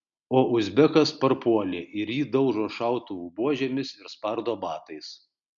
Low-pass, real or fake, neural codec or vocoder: 7.2 kHz; real; none